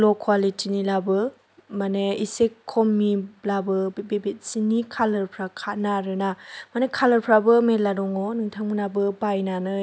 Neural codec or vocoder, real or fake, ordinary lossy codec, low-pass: none; real; none; none